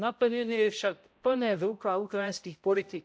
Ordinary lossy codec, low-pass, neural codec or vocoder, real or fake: none; none; codec, 16 kHz, 0.5 kbps, X-Codec, HuBERT features, trained on balanced general audio; fake